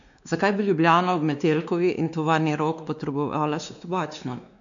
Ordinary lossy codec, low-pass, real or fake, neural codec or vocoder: none; 7.2 kHz; fake; codec, 16 kHz, 2 kbps, X-Codec, WavLM features, trained on Multilingual LibriSpeech